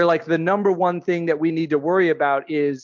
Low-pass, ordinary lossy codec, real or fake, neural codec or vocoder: 7.2 kHz; MP3, 64 kbps; real; none